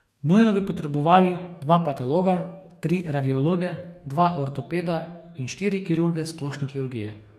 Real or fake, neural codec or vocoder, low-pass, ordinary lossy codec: fake; codec, 44.1 kHz, 2.6 kbps, DAC; 14.4 kHz; none